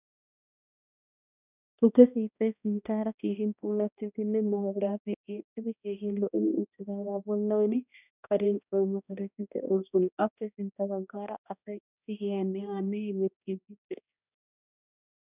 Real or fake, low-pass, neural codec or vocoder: fake; 3.6 kHz; codec, 16 kHz, 1 kbps, X-Codec, HuBERT features, trained on balanced general audio